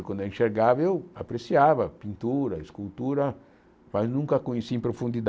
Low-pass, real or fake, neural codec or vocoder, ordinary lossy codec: none; real; none; none